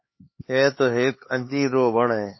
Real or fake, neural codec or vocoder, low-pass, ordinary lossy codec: fake; codec, 16 kHz, 4 kbps, X-Codec, HuBERT features, trained on LibriSpeech; 7.2 kHz; MP3, 24 kbps